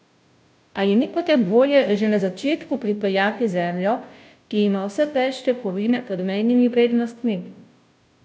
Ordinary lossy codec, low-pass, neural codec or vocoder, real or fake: none; none; codec, 16 kHz, 0.5 kbps, FunCodec, trained on Chinese and English, 25 frames a second; fake